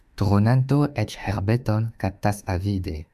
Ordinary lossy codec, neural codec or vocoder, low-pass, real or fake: AAC, 96 kbps; autoencoder, 48 kHz, 32 numbers a frame, DAC-VAE, trained on Japanese speech; 14.4 kHz; fake